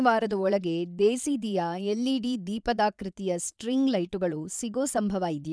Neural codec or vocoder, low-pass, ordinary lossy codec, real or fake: vocoder, 22.05 kHz, 80 mel bands, Vocos; none; none; fake